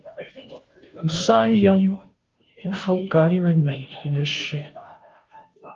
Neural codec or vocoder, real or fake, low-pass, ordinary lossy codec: codec, 16 kHz, 0.5 kbps, FunCodec, trained on Chinese and English, 25 frames a second; fake; 7.2 kHz; Opus, 32 kbps